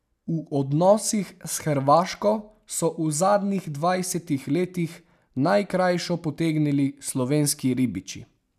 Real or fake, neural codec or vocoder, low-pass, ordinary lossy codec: real; none; 14.4 kHz; none